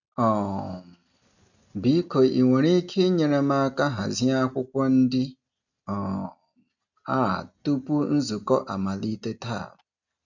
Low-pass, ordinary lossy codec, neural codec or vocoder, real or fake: 7.2 kHz; none; none; real